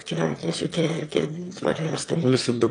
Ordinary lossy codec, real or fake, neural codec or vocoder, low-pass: AAC, 64 kbps; fake; autoencoder, 22.05 kHz, a latent of 192 numbers a frame, VITS, trained on one speaker; 9.9 kHz